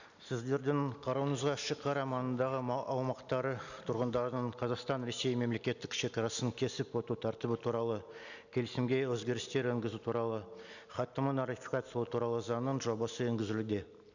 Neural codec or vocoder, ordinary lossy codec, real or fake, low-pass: none; none; real; 7.2 kHz